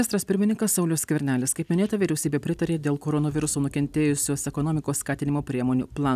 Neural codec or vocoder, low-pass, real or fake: none; 14.4 kHz; real